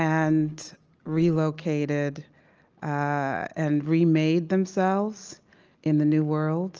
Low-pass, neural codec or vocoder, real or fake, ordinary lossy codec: 7.2 kHz; none; real; Opus, 24 kbps